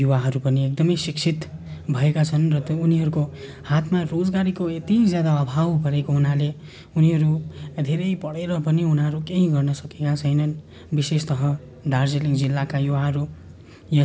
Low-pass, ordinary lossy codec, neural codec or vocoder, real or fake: none; none; none; real